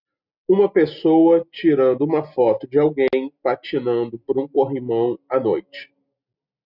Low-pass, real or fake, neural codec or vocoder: 5.4 kHz; real; none